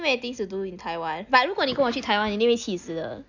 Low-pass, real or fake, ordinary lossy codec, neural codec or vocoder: 7.2 kHz; real; none; none